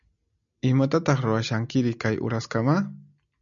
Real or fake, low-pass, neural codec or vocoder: real; 7.2 kHz; none